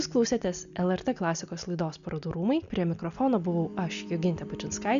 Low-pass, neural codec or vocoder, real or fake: 7.2 kHz; none; real